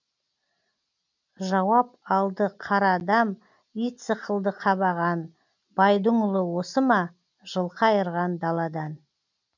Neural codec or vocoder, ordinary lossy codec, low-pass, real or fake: none; none; 7.2 kHz; real